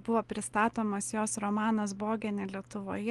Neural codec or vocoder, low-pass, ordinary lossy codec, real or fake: none; 10.8 kHz; Opus, 24 kbps; real